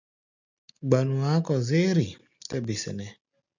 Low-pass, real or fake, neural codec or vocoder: 7.2 kHz; real; none